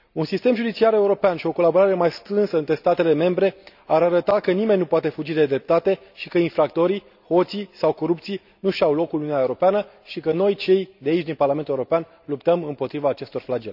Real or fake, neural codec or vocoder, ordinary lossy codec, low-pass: real; none; none; 5.4 kHz